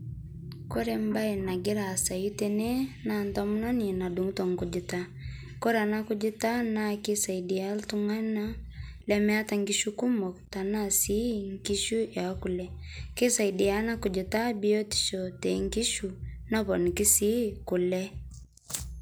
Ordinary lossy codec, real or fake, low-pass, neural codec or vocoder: none; real; none; none